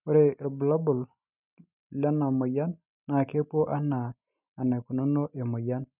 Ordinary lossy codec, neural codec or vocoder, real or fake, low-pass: none; none; real; 3.6 kHz